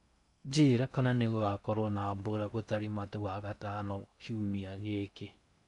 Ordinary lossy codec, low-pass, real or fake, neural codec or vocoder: none; 10.8 kHz; fake; codec, 16 kHz in and 24 kHz out, 0.6 kbps, FocalCodec, streaming, 2048 codes